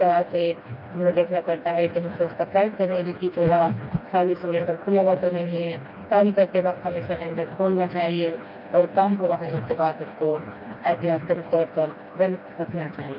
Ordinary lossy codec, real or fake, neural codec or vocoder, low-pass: none; fake; codec, 16 kHz, 1 kbps, FreqCodec, smaller model; 5.4 kHz